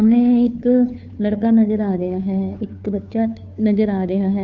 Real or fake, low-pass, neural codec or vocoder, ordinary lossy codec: fake; 7.2 kHz; codec, 16 kHz, 2 kbps, FunCodec, trained on Chinese and English, 25 frames a second; none